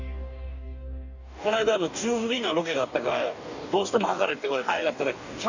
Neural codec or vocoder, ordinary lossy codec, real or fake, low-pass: codec, 44.1 kHz, 2.6 kbps, DAC; none; fake; 7.2 kHz